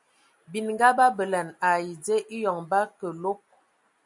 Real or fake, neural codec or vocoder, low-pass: real; none; 10.8 kHz